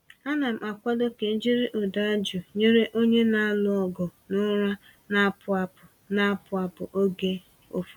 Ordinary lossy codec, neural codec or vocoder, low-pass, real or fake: none; none; 19.8 kHz; real